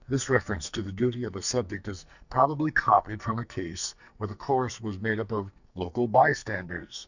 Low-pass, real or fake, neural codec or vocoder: 7.2 kHz; fake; codec, 44.1 kHz, 2.6 kbps, SNAC